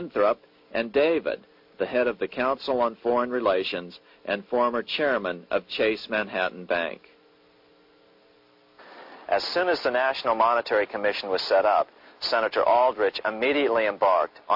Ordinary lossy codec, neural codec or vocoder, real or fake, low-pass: MP3, 48 kbps; none; real; 5.4 kHz